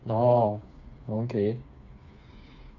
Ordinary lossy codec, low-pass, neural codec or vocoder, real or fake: none; 7.2 kHz; codec, 16 kHz, 4 kbps, FreqCodec, smaller model; fake